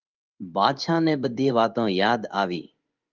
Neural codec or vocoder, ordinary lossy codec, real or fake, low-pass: codec, 16 kHz in and 24 kHz out, 1 kbps, XY-Tokenizer; Opus, 24 kbps; fake; 7.2 kHz